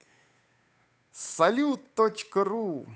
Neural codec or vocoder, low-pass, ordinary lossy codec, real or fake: codec, 16 kHz, 8 kbps, FunCodec, trained on Chinese and English, 25 frames a second; none; none; fake